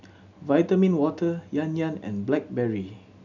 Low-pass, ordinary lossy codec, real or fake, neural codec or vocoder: 7.2 kHz; none; real; none